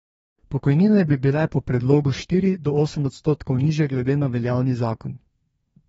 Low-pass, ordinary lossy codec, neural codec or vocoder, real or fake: 14.4 kHz; AAC, 24 kbps; codec, 32 kHz, 1.9 kbps, SNAC; fake